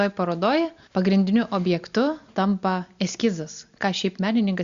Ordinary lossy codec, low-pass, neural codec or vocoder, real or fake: Opus, 64 kbps; 7.2 kHz; none; real